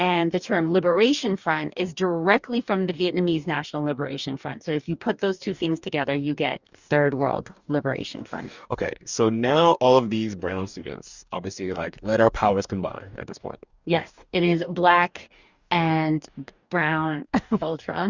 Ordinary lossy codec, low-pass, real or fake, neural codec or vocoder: Opus, 64 kbps; 7.2 kHz; fake; codec, 44.1 kHz, 2.6 kbps, DAC